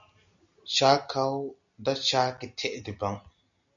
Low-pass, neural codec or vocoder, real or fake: 7.2 kHz; none; real